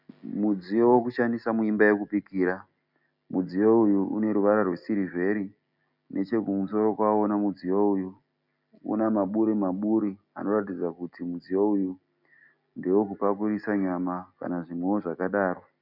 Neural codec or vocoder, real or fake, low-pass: none; real; 5.4 kHz